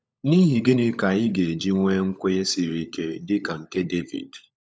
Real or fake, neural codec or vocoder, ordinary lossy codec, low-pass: fake; codec, 16 kHz, 16 kbps, FunCodec, trained on LibriTTS, 50 frames a second; none; none